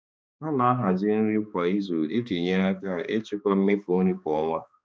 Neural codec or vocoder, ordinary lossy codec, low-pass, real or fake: codec, 16 kHz, 2 kbps, X-Codec, HuBERT features, trained on balanced general audio; none; none; fake